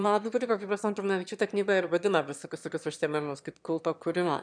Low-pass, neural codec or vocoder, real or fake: 9.9 kHz; autoencoder, 22.05 kHz, a latent of 192 numbers a frame, VITS, trained on one speaker; fake